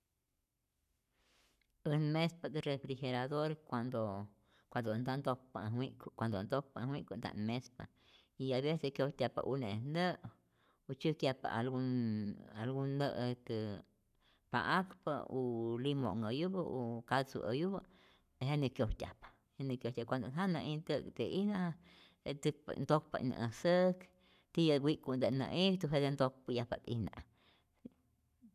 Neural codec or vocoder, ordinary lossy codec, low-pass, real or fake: codec, 44.1 kHz, 7.8 kbps, Pupu-Codec; none; 14.4 kHz; fake